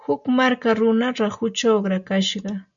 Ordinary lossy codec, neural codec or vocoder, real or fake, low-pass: MP3, 64 kbps; none; real; 7.2 kHz